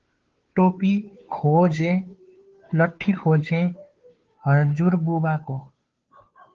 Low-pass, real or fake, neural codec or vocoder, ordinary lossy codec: 7.2 kHz; fake; codec, 16 kHz, 2 kbps, FunCodec, trained on Chinese and English, 25 frames a second; Opus, 16 kbps